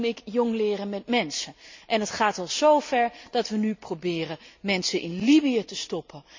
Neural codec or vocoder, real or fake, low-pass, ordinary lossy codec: none; real; 7.2 kHz; MP3, 64 kbps